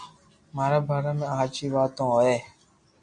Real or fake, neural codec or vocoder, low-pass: real; none; 9.9 kHz